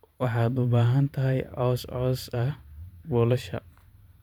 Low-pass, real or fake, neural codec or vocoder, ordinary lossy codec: 19.8 kHz; fake; vocoder, 44.1 kHz, 128 mel bands every 512 samples, BigVGAN v2; none